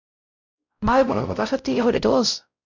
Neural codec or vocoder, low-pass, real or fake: codec, 16 kHz, 0.5 kbps, X-Codec, WavLM features, trained on Multilingual LibriSpeech; 7.2 kHz; fake